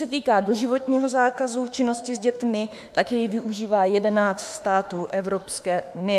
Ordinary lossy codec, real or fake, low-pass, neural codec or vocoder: AAC, 96 kbps; fake; 14.4 kHz; autoencoder, 48 kHz, 32 numbers a frame, DAC-VAE, trained on Japanese speech